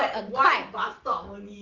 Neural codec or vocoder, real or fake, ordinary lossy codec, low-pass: none; real; Opus, 32 kbps; 7.2 kHz